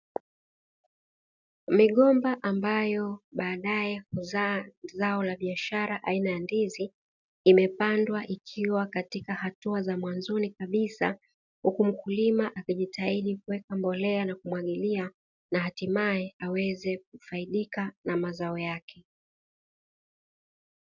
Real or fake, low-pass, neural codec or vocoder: real; 7.2 kHz; none